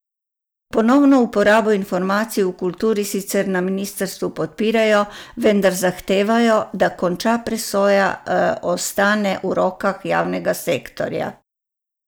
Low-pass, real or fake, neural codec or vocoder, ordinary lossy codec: none; real; none; none